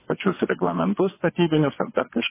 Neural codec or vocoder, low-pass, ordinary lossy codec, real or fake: vocoder, 22.05 kHz, 80 mel bands, WaveNeXt; 3.6 kHz; MP3, 16 kbps; fake